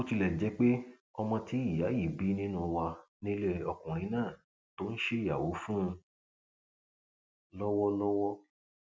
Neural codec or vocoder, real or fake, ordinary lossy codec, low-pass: none; real; none; none